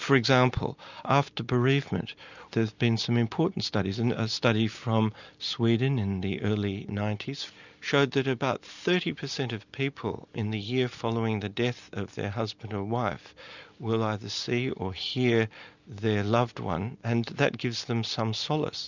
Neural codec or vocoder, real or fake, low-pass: none; real; 7.2 kHz